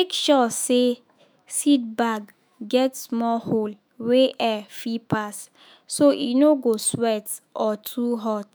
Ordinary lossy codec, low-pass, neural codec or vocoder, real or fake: none; none; autoencoder, 48 kHz, 128 numbers a frame, DAC-VAE, trained on Japanese speech; fake